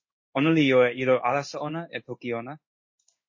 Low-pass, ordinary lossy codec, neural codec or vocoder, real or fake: 7.2 kHz; MP3, 32 kbps; codec, 16 kHz in and 24 kHz out, 1 kbps, XY-Tokenizer; fake